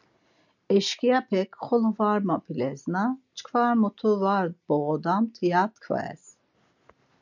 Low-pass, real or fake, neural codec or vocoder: 7.2 kHz; real; none